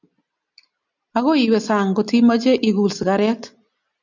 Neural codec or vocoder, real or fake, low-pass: none; real; 7.2 kHz